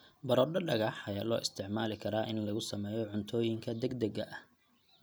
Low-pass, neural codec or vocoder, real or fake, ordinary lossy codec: none; none; real; none